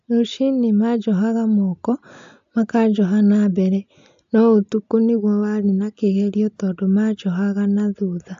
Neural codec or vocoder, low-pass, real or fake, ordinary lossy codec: none; 7.2 kHz; real; AAC, 64 kbps